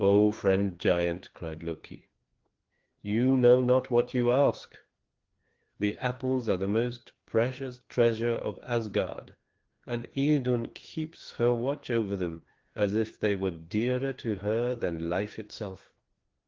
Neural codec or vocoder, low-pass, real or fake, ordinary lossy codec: codec, 16 kHz, 2 kbps, FreqCodec, larger model; 7.2 kHz; fake; Opus, 16 kbps